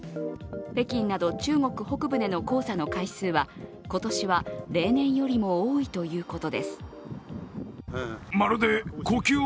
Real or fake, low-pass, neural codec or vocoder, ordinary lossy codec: real; none; none; none